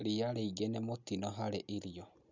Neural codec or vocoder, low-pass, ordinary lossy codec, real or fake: none; 7.2 kHz; none; real